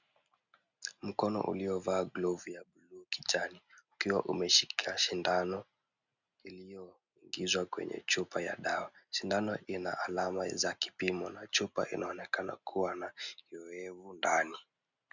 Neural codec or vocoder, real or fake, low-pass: none; real; 7.2 kHz